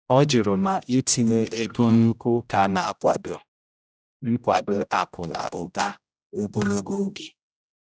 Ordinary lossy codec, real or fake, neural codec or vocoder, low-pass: none; fake; codec, 16 kHz, 0.5 kbps, X-Codec, HuBERT features, trained on general audio; none